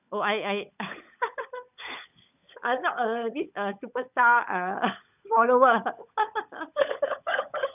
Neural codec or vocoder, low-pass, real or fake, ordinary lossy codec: codec, 16 kHz, 16 kbps, FunCodec, trained on LibriTTS, 50 frames a second; 3.6 kHz; fake; none